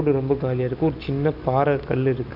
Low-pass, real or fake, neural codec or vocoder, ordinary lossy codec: 5.4 kHz; real; none; none